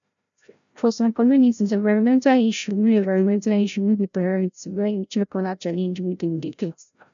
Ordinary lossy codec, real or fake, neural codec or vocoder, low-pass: none; fake; codec, 16 kHz, 0.5 kbps, FreqCodec, larger model; 7.2 kHz